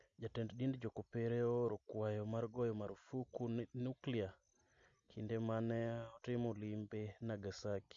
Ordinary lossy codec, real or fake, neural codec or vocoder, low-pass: MP3, 48 kbps; real; none; 7.2 kHz